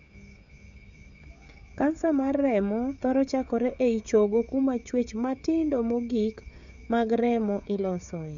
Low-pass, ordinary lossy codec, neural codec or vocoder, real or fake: 7.2 kHz; none; codec, 16 kHz, 16 kbps, FreqCodec, smaller model; fake